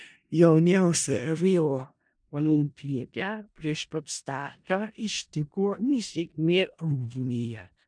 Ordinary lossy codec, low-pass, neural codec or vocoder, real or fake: MP3, 96 kbps; 9.9 kHz; codec, 16 kHz in and 24 kHz out, 0.4 kbps, LongCat-Audio-Codec, four codebook decoder; fake